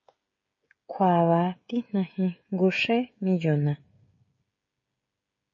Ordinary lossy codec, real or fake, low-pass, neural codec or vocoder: MP3, 32 kbps; fake; 7.2 kHz; codec, 16 kHz, 16 kbps, FreqCodec, smaller model